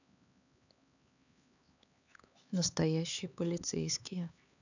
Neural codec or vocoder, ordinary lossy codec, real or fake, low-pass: codec, 16 kHz, 4 kbps, X-Codec, HuBERT features, trained on LibriSpeech; none; fake; 7.2 kHz